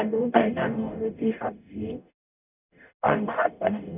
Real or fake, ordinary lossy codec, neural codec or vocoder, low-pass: fake; none; codec, 44.1 kHz, 0.9 kbps, DAC; 3.6 kHz